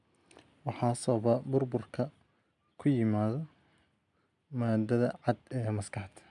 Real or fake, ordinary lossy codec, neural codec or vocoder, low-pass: real; none; none; 10.8 kHz